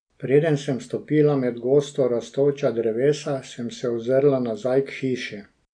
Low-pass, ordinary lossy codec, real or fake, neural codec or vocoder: 9.9 kHz; none; real; none